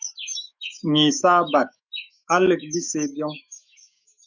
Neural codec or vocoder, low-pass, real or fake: autoencoder, 48 kHz, 128 numbers a frame, DAC-VAE, trained on Japanese speech; 7.2 kHz; fake